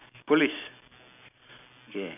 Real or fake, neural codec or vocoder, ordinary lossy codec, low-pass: real; none; none; 3.6 kHz